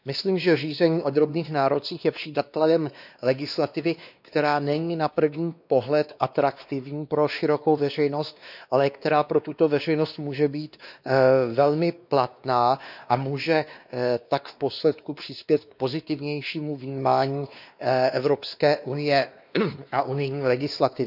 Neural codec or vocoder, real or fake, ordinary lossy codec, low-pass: codec, 16 kHz, 2 kbps, X-Codec, WavLM features, trained on Multilingual LibriSpeech; fake; none; 5.4 kHz